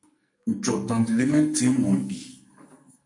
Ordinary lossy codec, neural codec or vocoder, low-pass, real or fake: MP3, 48 kbps; codec, 44.1 kHz, 2.6 kbps, SNAC; 10.8 kHz; fake